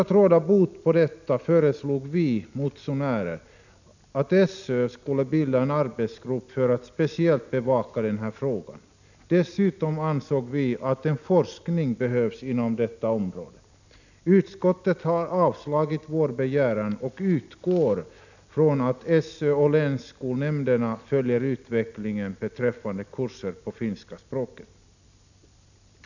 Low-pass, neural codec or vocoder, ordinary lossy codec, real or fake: 7.2 kHz; none; none; real